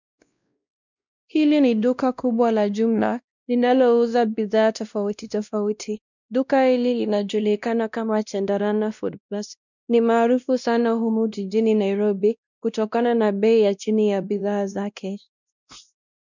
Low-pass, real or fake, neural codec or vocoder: 7.2 kHz; fake; codec, 16 kHz, 1 kbps, X-Codec, WavLM features, trained on Multilingual LibriSpeech